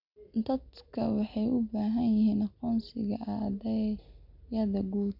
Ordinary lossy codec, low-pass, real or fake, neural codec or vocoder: none; 5.4 kHz; real; none